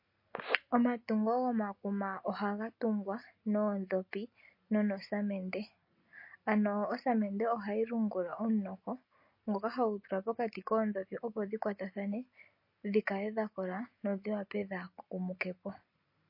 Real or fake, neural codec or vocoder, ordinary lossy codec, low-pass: real; none; MP3, 32 kbps; 5.4 kHz